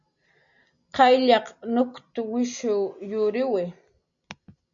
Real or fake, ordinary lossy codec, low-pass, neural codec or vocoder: real; MP3, 96 kbps; 7.2 kHz; none